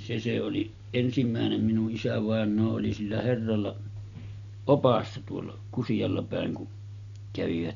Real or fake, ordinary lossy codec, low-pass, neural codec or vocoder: real; none; 7.2 kHz; none